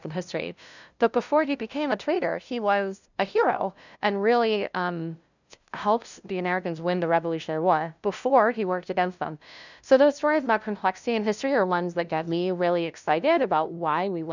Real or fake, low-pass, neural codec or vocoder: fake; 7.2 kHz; codec, 16 kHz, 0.5 kbps, FunCodec, trained on LibriTTS, 25 frames a second